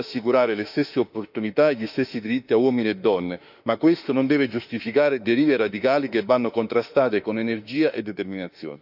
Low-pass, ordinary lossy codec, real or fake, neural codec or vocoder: 5.4 kHz; none; fake; autoencoder, 48 kHz, 32 numbers a frame, DAC-VAE, trained on Japanese speech